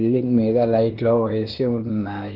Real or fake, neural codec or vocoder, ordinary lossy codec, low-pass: fake; codec, 16 kHz, 0.8 kbps, ZipCodec; Opus, 16 kbps; 5.4 kHz